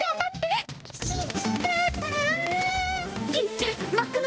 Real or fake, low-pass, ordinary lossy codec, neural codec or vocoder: fake; none; none; codec, 16 kHz, 1 kbps, X-Codec, HuBERT features, trained on balanced general audio